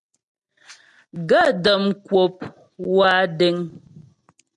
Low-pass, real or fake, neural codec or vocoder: 10.8 kHz; real; none